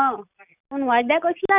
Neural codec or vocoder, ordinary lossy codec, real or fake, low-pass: none; none; real; 3.6 kHz